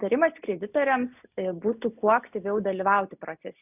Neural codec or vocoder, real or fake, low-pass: none; real; 3.6 kHz